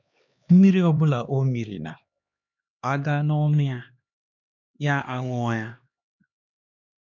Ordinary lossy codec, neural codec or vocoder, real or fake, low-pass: none; codec, 16 kHz, 2 kbps, X-Codec, HuBERT features, trained on LibriSpeech; fake; 7.2 kHz